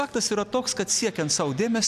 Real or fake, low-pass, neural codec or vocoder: real; 14.4 kHz; none